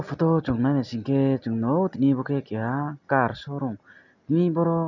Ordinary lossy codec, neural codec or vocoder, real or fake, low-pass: none; none; real; 7.2 kHz